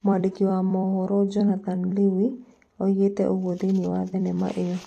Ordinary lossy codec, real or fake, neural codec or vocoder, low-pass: AAC, 48 kbps; fake; vocoder, 44.1 kHz, 128 mel bands every 256 samples, BigVGAN v2; 14.4 kHz